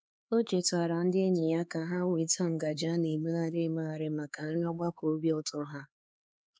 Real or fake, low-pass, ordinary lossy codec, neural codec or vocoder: fake; none; none; codec, 16 kHz, 4 kbps, X-Codec, HuBERT features, trained on LibriSpeech